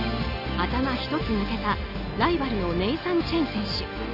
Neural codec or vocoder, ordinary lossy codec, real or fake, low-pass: none; none; real; 5.4 kHz